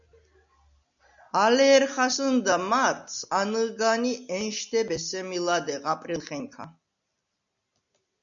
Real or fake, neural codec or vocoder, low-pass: real; none; 7.2 kHz